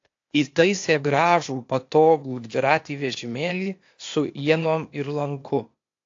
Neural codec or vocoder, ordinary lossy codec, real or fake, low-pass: codec, 16 kHz, 0.8 kbps, ZipCodec; AAC, 48 kbps; fake; 7.2 kHz